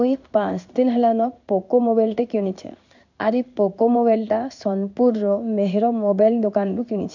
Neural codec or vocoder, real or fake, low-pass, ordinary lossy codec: codec, 16 kHz in and 24 kHz out, 1 kbps, XY-Tokenizer; fake; 7.2 kHz; none